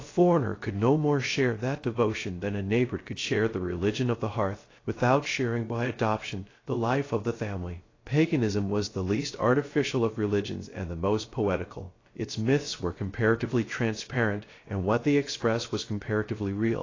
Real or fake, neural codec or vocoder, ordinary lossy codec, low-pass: fake; codec, 16 kHz, 0.3 kbps, FocalCodec; AAC, 32 kbps; 7.2 kHz